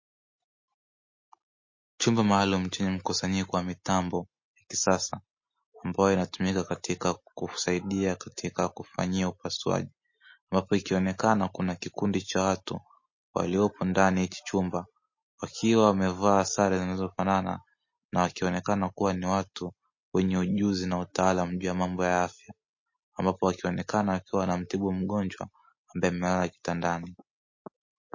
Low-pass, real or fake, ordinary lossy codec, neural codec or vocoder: 7.2 kHz; real; MP3, 32 kbps; none